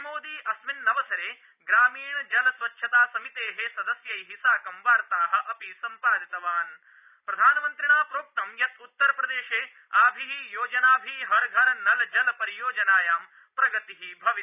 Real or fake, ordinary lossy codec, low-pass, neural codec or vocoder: real; none; 3.6 kHz; none